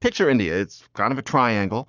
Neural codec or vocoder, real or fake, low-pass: codec, 44.1 kHz, 7.8 kbps, Pupu-Codec; fake; 7.2 kHz